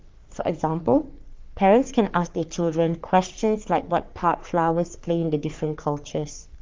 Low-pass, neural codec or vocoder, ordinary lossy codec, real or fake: 7.2 kHz; codec, 44.1 kHz, 3.4 kbps, Pupu-Codec; Opus, 32 kbps; fake